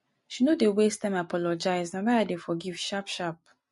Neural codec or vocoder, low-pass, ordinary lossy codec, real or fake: none; 14.4 kHz; MP3, 48 kbps; real